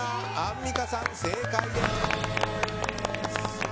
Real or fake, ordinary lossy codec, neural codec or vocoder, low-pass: real; none; none; none